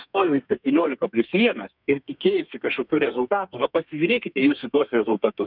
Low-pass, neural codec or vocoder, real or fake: 5.4 kHz; codec, 32 kHz, 1.9 kbps, SNAC; fake